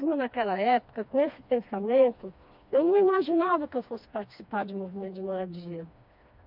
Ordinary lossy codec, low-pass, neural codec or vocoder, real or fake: MP3, 48 kbps; 5.4 kHz; codec, 16 kHz, 2 kbps, FreqCodec, smaller model; fake